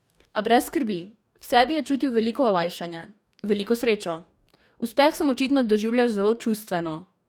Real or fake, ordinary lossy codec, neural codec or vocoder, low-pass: fake; none; codec, 44.1 kHz, 2.6 kbps, DAC; 19.8 kHz